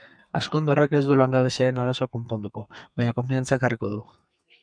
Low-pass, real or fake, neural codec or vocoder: 9.9 kHz; fake; codec, 32 kHz, 1.9 kbps, SNAC